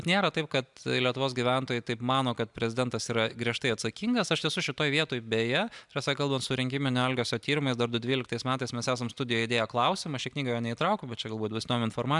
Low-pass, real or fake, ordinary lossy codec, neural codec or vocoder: 10.8 kHz; real; MP3, 96 kbps; none